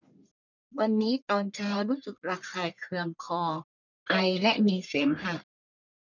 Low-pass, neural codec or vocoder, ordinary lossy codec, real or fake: 7.2 kHz; codec, 44.1 kHz, 1.7 kbps, Pupu-Codec; none; fake